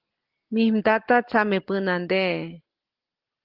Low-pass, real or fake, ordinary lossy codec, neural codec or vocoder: 5.4 kHz; real; Opus, 16 kbps; none